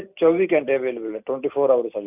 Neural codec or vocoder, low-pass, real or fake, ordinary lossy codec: vocoder, 44.1 kHz, 128 mel bands every 256 samples, BigVGAN v2; 3.6 kHz; fake; none